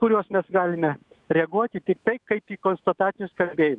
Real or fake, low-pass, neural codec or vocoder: fake; 10.8 kHz; autoencoder, 48 kHz, 128 numbers a frame, DAC-VAE, trained on Japanese speech